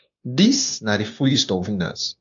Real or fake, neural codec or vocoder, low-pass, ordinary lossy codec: fake; codec, 16 kHz, 0.9 kbps, LongCat-Audio-Codec; 7.2 kHz; AAC, 64 kbps